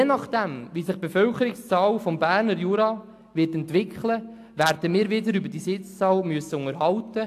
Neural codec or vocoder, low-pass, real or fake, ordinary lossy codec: none; 14.4 kHz; real; AAC, 64 kbps